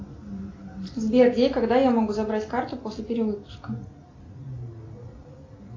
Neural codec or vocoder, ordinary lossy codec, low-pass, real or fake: none; AAC, 32 kbps; 7.2 kHz; real